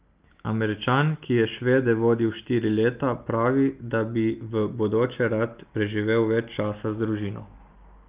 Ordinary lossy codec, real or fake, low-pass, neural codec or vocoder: Opus, 32 kbps; real; 3.6 kHz; none